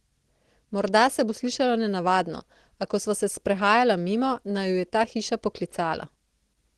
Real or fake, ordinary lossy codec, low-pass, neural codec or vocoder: real; Opus, 16 kbps; 10.8 kHz; none